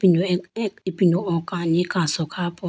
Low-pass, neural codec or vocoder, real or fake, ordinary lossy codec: none; none; real; none